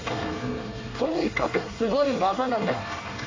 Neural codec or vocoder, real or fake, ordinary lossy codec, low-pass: codec, 24 kHz, 1 kbps, SNAC; fake; AAC, 32 kbps; 7.2 kHz